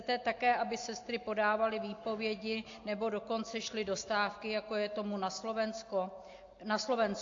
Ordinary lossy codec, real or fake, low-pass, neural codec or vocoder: AAC, 48 kbps; real; 7.2 kHz; none